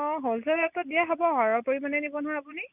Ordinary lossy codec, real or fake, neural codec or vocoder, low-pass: none; real; none; 3.6 kHz